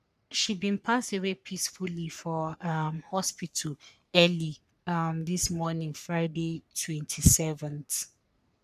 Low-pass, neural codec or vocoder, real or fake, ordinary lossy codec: 14.4 kHz; codec, 44.1 kHz, 3.4 kbps, Pupu-Codec; fake; none